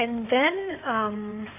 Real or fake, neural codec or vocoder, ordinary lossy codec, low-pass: fake; codec, 16 kHz in and 24 kHz out, 2.2 kbps, FireRedTTS-2 codec; none; 3.6 kHz